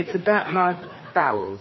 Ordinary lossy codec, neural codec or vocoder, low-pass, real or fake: MP3, 24 kbps; codec, 16 kHz, 4 kbps, FreqCodec, larger model; 7.2 kHz; fake